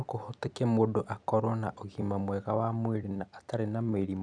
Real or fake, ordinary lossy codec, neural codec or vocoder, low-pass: fake; none; vocoder, 44.1 kHz, 128 mel bands every 256 samples, BigVGAN v2; 9.9 kHz